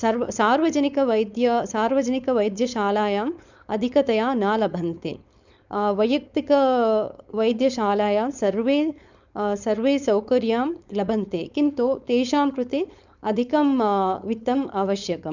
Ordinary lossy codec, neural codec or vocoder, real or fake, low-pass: none; codec, 16 kHz, 4.8 kbps, FACodec; fake; 7.2 kHz